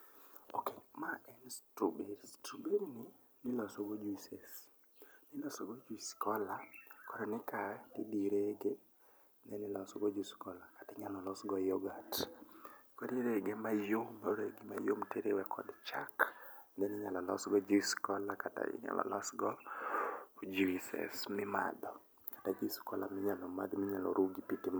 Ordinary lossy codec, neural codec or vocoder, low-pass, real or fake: none; none; none; real